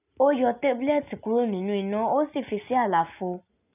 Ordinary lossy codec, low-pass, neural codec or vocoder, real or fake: none; 3.6 kHz; none; real